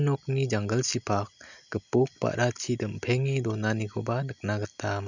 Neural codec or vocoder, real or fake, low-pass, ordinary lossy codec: none; real; 7.2 kHz; none